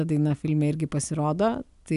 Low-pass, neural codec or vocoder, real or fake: 10.8 kHz; none; real